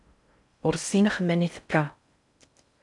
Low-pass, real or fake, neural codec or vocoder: 10.8 kHz; fake; codec, 16 kHz in and 24 kHz out, 0.6 kbps, FocalCodec, streaming, 4096 codes